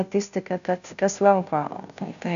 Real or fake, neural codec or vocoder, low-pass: fake; codec, 16 kHz, 0.5 kbps, FunCodec, trained on Chinese and English, 25 frames a second; 7.2 kHz